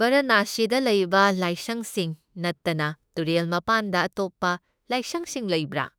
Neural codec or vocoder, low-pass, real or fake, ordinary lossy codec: autoencoder, 48 kHz, 32 numbers a frame, DAC-VAE, trained on Japanese speech; none; fake; none